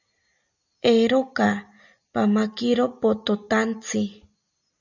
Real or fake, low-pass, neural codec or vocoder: real; 7.2 kHz; none